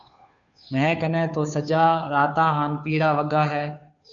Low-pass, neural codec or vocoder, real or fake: 7.2 kHz; codec, 16 kHz, 2 kbps, FunCodec, trained on Chinese and English, 25 frames a second; fake